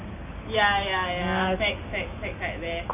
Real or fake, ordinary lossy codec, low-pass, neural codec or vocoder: real; none; 3.6 kHz; none